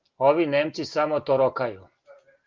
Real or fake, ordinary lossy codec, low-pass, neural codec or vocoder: real; Opus, 32 kbps; 7.2 kHz; none